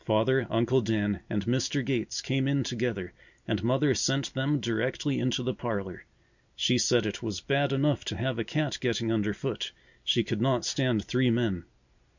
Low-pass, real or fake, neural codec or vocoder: 7.2 kHz; real; none